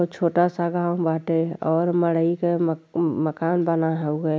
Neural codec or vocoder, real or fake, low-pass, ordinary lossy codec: none; real; none; none